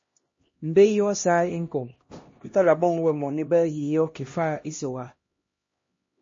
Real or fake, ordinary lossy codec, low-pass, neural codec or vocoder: fake; MP3, 32 kbps; 7.2 kHz; codec, 16 kHz, 1 kbps, X-Codec, HuBERT features, trained on LibriSpeech